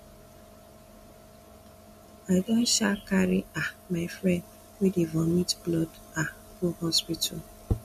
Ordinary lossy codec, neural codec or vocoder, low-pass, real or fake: MP3, 64 kbps; none; 14.4 kHz; real